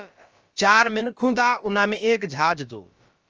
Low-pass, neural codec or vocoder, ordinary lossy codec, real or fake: 7.2 kHz; codec, 16 kHz, about 1 kbps, DyCAST, with the encoder's durations; Opus, 24 kbps; fake